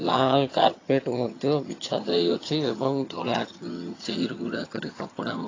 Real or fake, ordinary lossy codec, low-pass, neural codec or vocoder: fake; AAC, 32 kbps; 7.2 kHz; vocoder, 22.05 kHz, 80 mel bands, HiFi-GAN